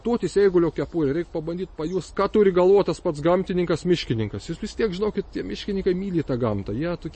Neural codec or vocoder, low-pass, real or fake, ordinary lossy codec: none; 10.8 kHz; real; MP3, 32 kbps